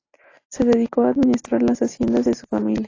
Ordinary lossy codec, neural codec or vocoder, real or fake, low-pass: AAC, 32 kbps; none; real; 7.2 kHz